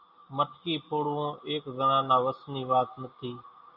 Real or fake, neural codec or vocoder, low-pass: real; none; 5.4 kHz